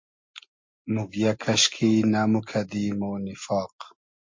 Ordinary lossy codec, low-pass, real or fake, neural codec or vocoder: MP3, 48 kbps; 7.2 kHz; real; none